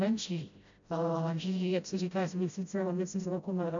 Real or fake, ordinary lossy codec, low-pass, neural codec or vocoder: fake; MP3, 64 kbps; 7.2 kHz; codec, 16 kHz, 0.5 kbps, FreqCodec, smaller model